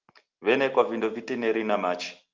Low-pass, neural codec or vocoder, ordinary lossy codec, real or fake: 7.2 kHz; none; Opus, 24 kbps; real